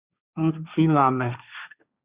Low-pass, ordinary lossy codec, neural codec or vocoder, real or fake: 3.6 kHz; Opus, 64 kbps; codec, 16 kHz, 1.1 kbps, Voila-Tokenizer; fake